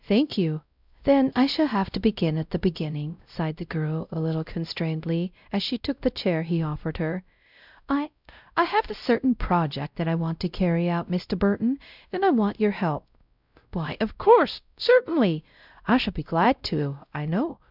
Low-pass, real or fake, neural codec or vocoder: 5.4 kHz; fake; codec, 16 kHz, 0.5 kbps, X-Codec, WavLM features, trained on Multilingual LibriSpeech